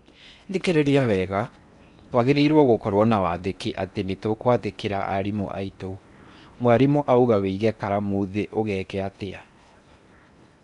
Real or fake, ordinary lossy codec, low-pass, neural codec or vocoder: fake; none; 10.8 kHz; codec, 16 kHz in and 24 kHz out, 0.8 kbps, FocalCodec, streaming, 65536 codes